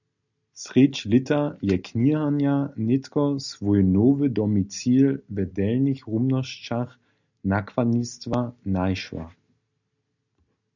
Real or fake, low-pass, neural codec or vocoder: real; 7.2 kHz; none